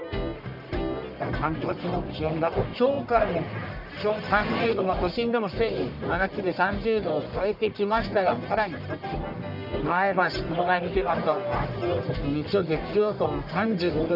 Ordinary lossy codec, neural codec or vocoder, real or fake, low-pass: none; codec, 44.1 kHz, 1.7 kbps, Pupu-Codec; fake; 5.4 kHz